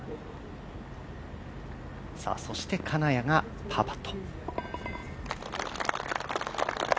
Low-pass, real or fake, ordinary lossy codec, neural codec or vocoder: none; real; none; none